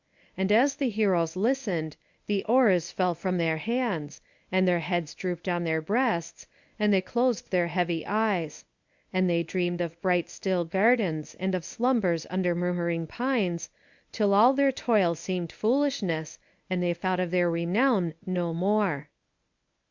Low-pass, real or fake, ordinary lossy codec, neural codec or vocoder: 7.2 kHz; fake; Opus, 64 kbps; codec, 24 kHz, 0.9 kbps, WavTokenizer, medium speech release version 1